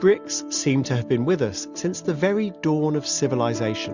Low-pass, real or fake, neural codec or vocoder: 7.2 kHz; real; none